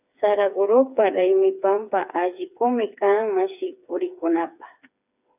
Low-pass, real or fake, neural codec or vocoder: 3.6 kHz; fake; codec, 16 kHz, 4 kbps, FreqCodec, smaller model